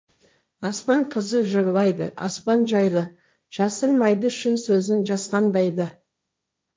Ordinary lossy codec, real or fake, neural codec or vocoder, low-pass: none; fake; codec, 16 kHz, 1.1 kbps, Voila-Tokenizer; none